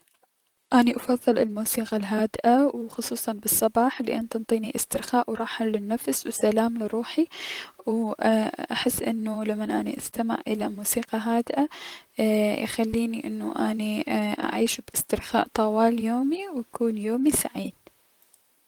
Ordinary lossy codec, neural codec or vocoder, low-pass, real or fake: Opus, 24 kbps; vocoder, 44.1 kHz, 128 mel bands, Pupu-Vocoder; 19.8 kHz; fake